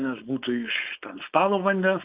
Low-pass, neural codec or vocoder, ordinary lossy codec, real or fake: 3.6 kHz; codec, 16 kHz, 4.8 kbps, FACodec; Opus, 32 kbps; fake